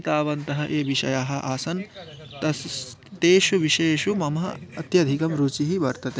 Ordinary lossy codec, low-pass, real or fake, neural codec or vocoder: none; none; real; none